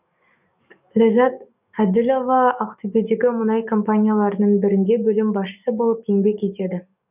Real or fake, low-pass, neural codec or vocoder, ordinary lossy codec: fake; 3.6 kHz; codec, 44.1 kHz, 7.8 kbps, DAC; none